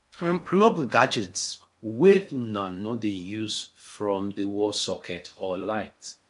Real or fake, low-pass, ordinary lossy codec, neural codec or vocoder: fake; 10.8 kHz; MP3, 64 kbps; codec, 16 kHz in and 24 kHz out, 0.6 kbps, FocalCodec, streaming, 4096 codes